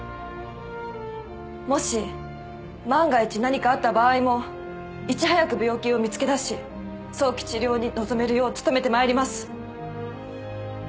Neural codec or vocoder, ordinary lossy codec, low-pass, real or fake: none; none; none; real